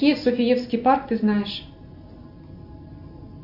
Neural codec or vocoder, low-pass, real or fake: none; 5.4 kHz; real